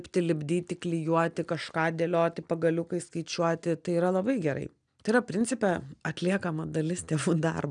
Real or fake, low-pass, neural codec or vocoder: real; 9.9 kHz; none